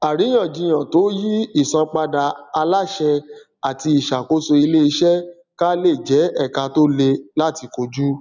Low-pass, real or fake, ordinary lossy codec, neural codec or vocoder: 7.2 kHz; real; none; none